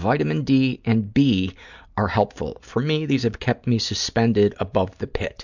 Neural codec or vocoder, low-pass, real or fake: none; 7.2 kHz; real